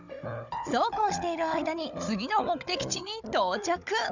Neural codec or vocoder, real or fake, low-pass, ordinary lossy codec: codec, 16 kHz, 16 kbps, FunCodec, trained on Chinese and English, 50 frames a second; fake; 7.2 kHz; none